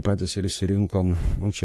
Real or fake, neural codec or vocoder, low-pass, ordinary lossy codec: fake; codec, 44.1 kHz, 3.4 kbps, Pupu-Codec; 14.4 kHz; AAC, 64 kbps